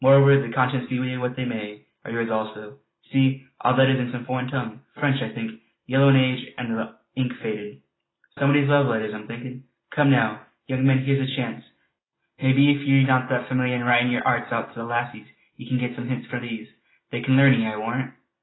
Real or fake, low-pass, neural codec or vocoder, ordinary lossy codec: real; 7.2 kHz; none; AAC, 16 kbps